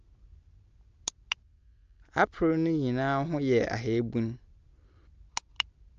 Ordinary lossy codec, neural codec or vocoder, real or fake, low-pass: Opus, 24 kbps; none; real; 7.2 kHz